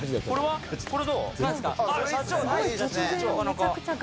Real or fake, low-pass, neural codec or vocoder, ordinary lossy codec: real; none; none; none